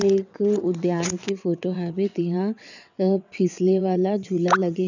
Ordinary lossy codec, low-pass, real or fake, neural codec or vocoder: none; 7.2 kHz; fake; vocoder, 22.05 kHz, 80 mel bands, WaveNeXt